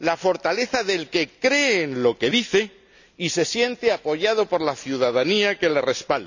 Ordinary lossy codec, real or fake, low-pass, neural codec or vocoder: none; real; 7.2 kHz; none